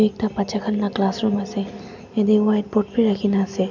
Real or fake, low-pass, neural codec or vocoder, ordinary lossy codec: real; 7.2 kHz; none; none